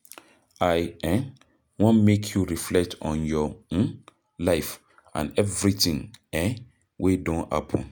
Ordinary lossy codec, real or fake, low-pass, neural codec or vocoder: none; real; none; none